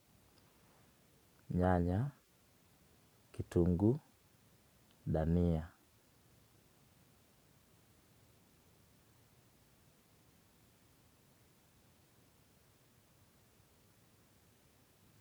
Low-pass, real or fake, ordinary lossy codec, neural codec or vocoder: none; fake; none; vocoder, 44.1 kHz, 128 mel bands every 512 samples, BigVGAN v2